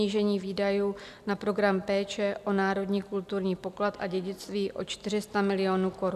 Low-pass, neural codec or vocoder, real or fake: 14.4 kHz; none; real